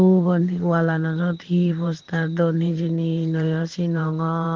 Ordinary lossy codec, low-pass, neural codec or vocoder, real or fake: Opus, 16 kbps; 7.2 kHz; vocoder, 44.1 kHz, 128 mel bands every 512 samples, BigVGAN v2; fake